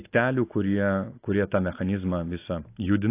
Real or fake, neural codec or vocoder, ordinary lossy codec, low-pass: real; none; AAC, 24 kbps; 3.6 kHz